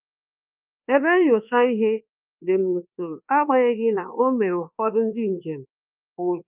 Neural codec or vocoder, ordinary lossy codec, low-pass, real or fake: codec, 24 kHz, 1.2 kbps, DualCodec; Opus, 24 kbps; 3.6 kHz; fake